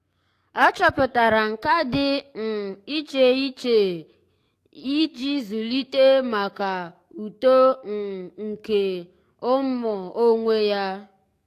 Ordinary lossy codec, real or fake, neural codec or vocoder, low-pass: AAC, 64 kbps; fake; codec, 44.1 kHz, 7.8 kbps, DAC; 14.4 kHz